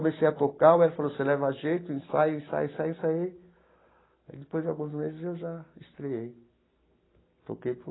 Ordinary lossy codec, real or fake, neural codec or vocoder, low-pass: AAC, 16 kbps; real; none; 7.2 kHz